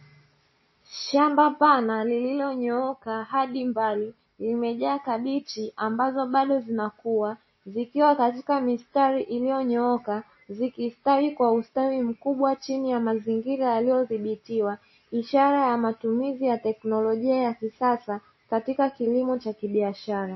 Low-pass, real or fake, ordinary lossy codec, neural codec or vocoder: 7.2 kHz; real; MP3, 24 kbps; none